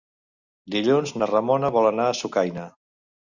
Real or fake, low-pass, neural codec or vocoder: real; 7.2 kHz; none